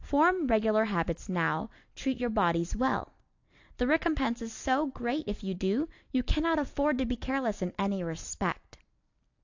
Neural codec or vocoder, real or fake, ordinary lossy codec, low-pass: none; real; AAC, 48 kbps; 7.2 kHz